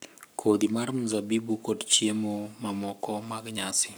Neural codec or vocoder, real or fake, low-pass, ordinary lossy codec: codec, 44.1 kHz, 7.8 kbps, Pupu-Codec; fake; none; none